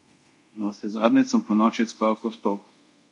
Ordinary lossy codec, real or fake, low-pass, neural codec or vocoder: MP3, 64 kbps; fake; 10.8 kHz; codec, 24 kHz, 0.5 kbps, DualCodec